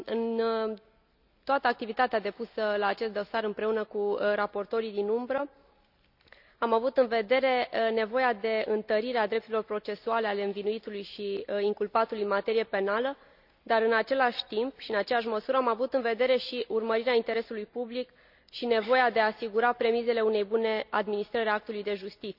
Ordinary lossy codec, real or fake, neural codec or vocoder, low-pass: none; real; none; 5.4 kHz